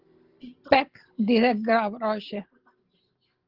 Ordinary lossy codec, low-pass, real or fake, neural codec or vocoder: Opus, 16 kbps; 5.4 kHz; real; none